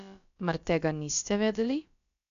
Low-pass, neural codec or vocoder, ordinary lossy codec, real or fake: 7.2 kHz; codec, 16 kHz, about 1 kbps, DyCAST, with the encoder's durations; none; fake